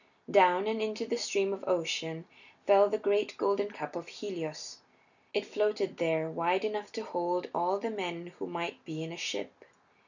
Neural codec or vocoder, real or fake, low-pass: none; real; 7.2 kHz